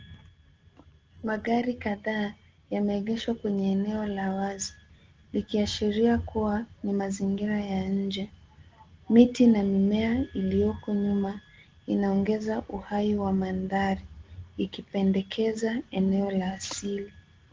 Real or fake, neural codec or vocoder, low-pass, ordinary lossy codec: real; none; 7.2 kHz; Opus, 16 kbps